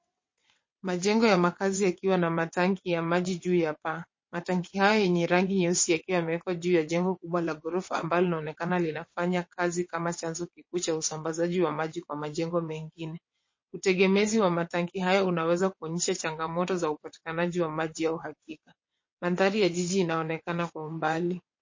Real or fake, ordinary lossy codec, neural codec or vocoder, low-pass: fake; MP3, 32 kbps; vocoder, 22.05 kHz, 80 mel bands, WaveNeXt; 7.2 kHz